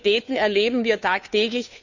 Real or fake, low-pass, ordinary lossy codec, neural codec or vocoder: fake; 7.2 kHz; none; codec, 16 kHz, 2 kbps, FunCodec, trained on Chinese and English, 25 frames a second